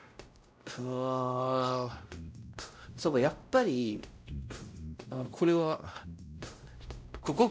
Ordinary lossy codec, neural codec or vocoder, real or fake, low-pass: none; codec, 16 kHz, 0.5 kbps, X-Codec, WavLM features, trained on Multilingual LibriSpeech; fake; none